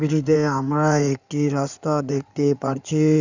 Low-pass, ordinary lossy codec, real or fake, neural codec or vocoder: 7.2 kHz; none; fake; codec, 16 kHz in and 24 kHz out, 2.2 kbps, FireRedTTS-2 codec